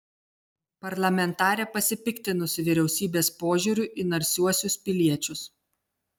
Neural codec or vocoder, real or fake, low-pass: none; real; 19.8 kHz